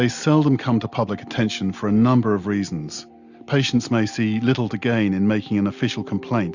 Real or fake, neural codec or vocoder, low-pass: real; none; 7.2 kHz